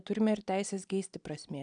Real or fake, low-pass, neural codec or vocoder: real; 9.9 kHz; none